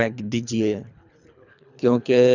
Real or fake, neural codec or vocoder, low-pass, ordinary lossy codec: fake; codec, 24 kHz, 3 kbps, HILCodec; 7.2 kHz; none